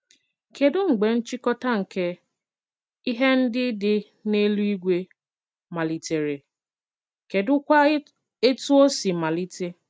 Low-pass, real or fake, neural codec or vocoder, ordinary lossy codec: none; real; none; none